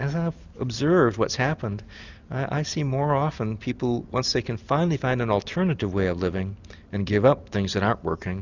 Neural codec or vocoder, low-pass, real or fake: none; 7.2 kHz; real